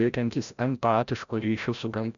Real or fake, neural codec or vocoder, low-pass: fake; codec, 16 kHz, 0.5 kbps, FreqCodec, larger model; 7.2 kHz